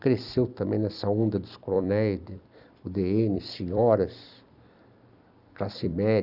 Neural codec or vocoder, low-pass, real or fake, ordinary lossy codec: vocoder, 44.1 kHz, 128 mel bands every 256 samples, BigVGAN v2; 5.4 kHz; fake; none